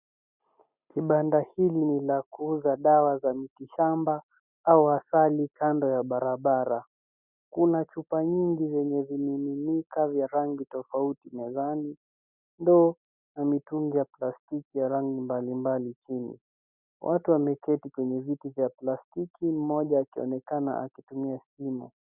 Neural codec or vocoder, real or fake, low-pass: none; real; 3.6 kHz